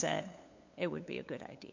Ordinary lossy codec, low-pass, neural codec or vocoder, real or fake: MP3, 48 kbps; 7.2 kHz; codec, 16 kHz, 8 kbps, FunCodec, trained on LibriTTS, 25 frames a second; fake